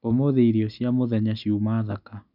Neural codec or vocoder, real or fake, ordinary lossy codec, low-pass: none; real; none; 5.4 kHz